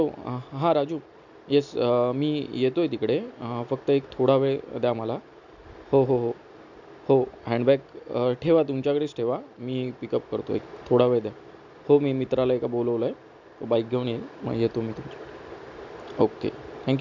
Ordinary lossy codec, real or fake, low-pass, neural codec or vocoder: none; real; 7.2 kHz; none